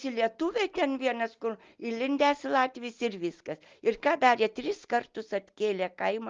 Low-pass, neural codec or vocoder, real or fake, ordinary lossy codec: 7.2 kHz; none; real; Opus, 24 kbps